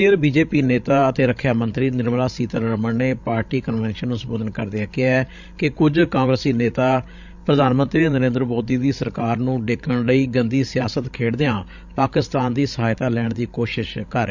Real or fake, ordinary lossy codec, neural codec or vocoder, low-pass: fake; none; codec, 16 kHz, 16 kbps, FreqCodec, larger model; 7.2 kHz